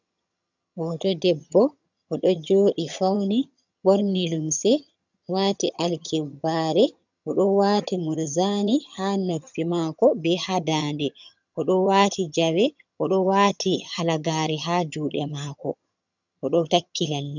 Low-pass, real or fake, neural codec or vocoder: 7.2 kHz; fake; vocoder, 22.05 kHz, 80 mel bands, HiFi-GAN